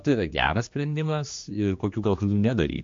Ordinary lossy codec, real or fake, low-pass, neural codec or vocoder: MP3, 48 kbps; fake; 7.2 kHz; codec, 16 kHz, 2 kbps, X-Codec, HuBERT features, trained on general audio